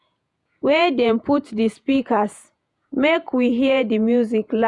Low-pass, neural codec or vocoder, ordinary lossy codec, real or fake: 10.8 kHz; vocoder, 48 kHz, 128 mel bands, Vocos; none; fake